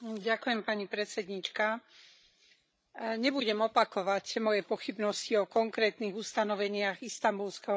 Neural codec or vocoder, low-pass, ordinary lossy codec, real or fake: codec, 16 kHz, 16 kbps, FreqCodec, larger model; none; none; fake